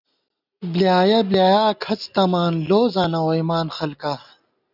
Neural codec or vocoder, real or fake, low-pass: none; real; 5.4 kHz